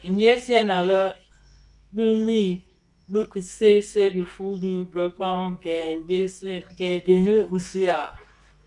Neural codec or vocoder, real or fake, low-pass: codec, 24 kHz, 0.9 kbps, WavTokenizer, medium music audio release; fake; 10.8 kHz